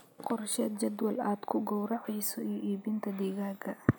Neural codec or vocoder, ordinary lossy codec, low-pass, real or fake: none; none; none; real